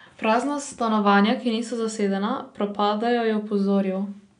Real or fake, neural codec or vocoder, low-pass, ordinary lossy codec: real; none; 9.9 kHz; none